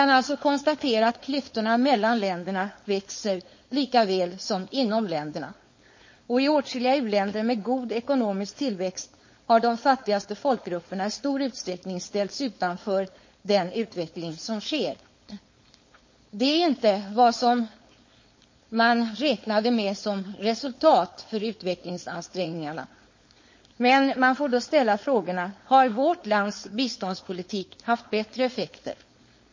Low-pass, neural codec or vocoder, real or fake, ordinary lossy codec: 7.2 kHz; codec, 16 kHz, 4.8 kbps, FACodec; fake; MP3, 32 kbps